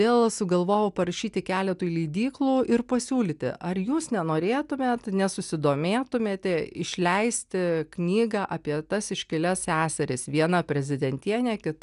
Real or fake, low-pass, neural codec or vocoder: real; 10.8 kHz; none